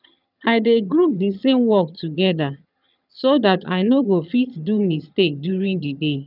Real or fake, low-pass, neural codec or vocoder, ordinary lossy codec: fake; 5.4 kHz; vocoder, 22.05 kHz, 80 mel bands, HiFi-GAN; none